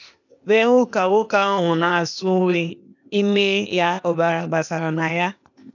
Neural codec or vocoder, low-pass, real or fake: codec, 16 kHz, 0.8 kbps, ZipCodec; 7.2 kHz; fake